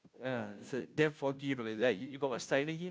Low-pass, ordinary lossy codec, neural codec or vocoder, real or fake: none; none; codec, 16 kHz, 0.5 kbps, FunCodec, trained on Chinese and English, 25 frames a second; fake